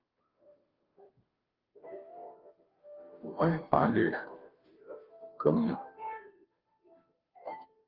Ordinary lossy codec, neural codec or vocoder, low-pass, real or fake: Opus, 24 kbps; codec, 16 kHz, 0.5 kbps, FunCodec, trained on Chinese and English, 25 frames a second; 5.4 kHz; fake